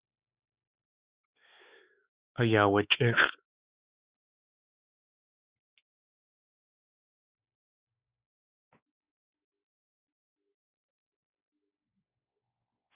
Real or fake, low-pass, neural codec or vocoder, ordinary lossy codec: fake; 3.6 kHz; codec, 16 kHz, 4 kbps, X-Codec, WavLM features, trained on Multilingual LibriSpeech; Opus, 64 kbps